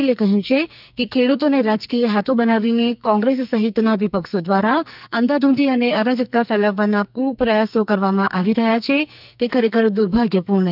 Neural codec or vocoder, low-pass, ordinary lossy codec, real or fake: codec, 44.1 kHz, 2.6 kbps, SNAC; 5.4 kHz; none; fake